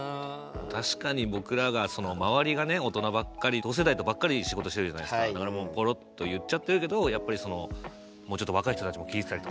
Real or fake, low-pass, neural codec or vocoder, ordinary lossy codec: real; none; none; none